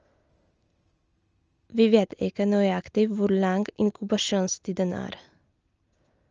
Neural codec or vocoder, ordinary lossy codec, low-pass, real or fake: none; Opus, 24 kbps; 7.2 kHz; real